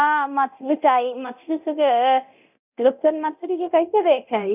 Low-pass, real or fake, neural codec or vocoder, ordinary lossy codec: 3.6 kHz; fake; codec, 24 kHz, 0.9 kbps, DualCodec; none